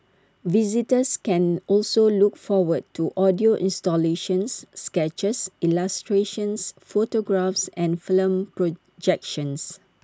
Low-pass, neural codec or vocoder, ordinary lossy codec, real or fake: none; none; none; real